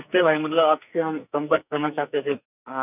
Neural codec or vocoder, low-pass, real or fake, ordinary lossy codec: codec, 44.1 kHz, 2.6 kbps, SNAC; 3.6 kHz; fake; none